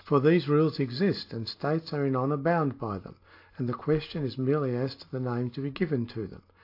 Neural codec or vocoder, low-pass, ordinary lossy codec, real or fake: none; 5.4 kHz; AAC, 32 kbps; real